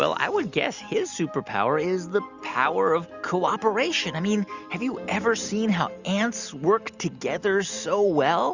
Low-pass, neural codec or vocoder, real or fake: 7.2 kHz; none; real